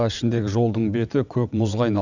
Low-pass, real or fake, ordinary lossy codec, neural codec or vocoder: 7.2 kHz; fake; none; vocoder, 22.05 kHz, 80 mel bands, WaveNeXt